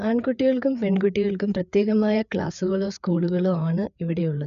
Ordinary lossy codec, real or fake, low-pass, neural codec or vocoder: none; fake; 7.2 kHz; codec, 16 kHz, 4 kbps, FreqCodec, larger model